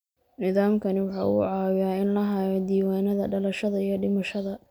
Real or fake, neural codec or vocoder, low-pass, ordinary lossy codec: real; none; none; none